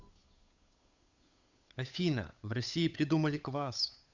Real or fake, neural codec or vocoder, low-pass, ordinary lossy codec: fake; codec, 16 kHz, 8 kbps, FunCodec, trained on Chinese and English, 25 frames a second; 7.2 kHz; Opus, 64 kbps